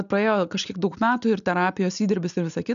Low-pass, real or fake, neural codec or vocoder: 7.2 kHz; real; none